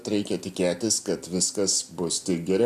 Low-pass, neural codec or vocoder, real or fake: 14.4 kHz; none; real